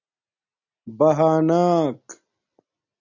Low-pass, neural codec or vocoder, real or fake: 7.2 kHz; none; real